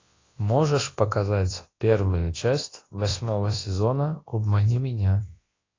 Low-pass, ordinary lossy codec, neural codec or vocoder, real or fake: 7.2 kHz; AAC, 32 kbps; codec, 24 kHz, 0.9 kbps, WavTokenizer, large speech release; fake